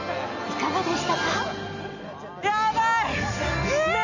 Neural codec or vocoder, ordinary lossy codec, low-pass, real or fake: none; none; 7.2 kHz; real